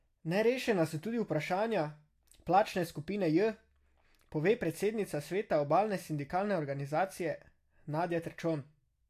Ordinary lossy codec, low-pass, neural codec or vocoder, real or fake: AAC, 64 kbps; 14.4 kHz; none; real